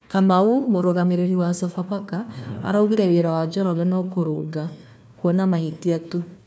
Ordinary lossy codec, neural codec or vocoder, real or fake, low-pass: none; codec, 16 kHz, 1 kbps, FunCodec, trained on Chinese and English, 50 frames a second; fake; none